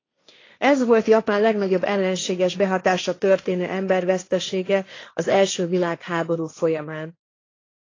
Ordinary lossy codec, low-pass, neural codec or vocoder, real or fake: AAC, 32 kbps; 7.2 kHz; codec, 16 kHz, 1.1 kbps, Voila-Tokenizer; fake